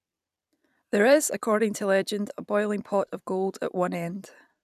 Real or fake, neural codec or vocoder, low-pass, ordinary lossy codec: fake; vocoder, 44.1 kHz, 128 mel bands every 256 samples, BigVGAN v2; 14.4 kHz; none